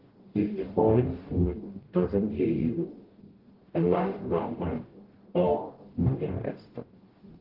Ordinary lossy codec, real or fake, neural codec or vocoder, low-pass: Opus, 16 kbps; fake; codec, 44.1 kHz, 0.9 kbps, DAC; 5.4 kHz